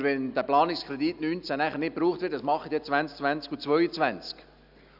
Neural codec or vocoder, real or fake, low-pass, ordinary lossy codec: none; real; 5.4 kHz; none